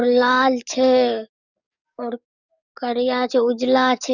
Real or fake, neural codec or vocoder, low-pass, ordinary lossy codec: fake; codec, 44.1 kHz, 7.8 kbps, DAC; 7.2 kHz; none